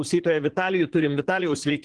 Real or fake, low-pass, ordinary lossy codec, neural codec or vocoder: fake; 9.9 kHz; Opus, 16 kbps; vocoder, 22.05 kHz, 80 mel bands, Vocos